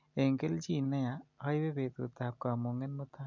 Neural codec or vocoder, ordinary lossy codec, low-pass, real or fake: none; MP3, 64 kbps; 7.2 kHz; real